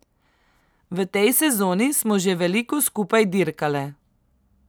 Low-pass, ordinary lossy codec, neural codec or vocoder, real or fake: none; none; none; real